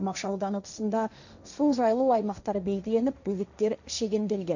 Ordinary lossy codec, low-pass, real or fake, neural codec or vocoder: none; 7.2 kHz; fake; codec, 16 kHz, 1.1 kbps, Voila-Tokenizer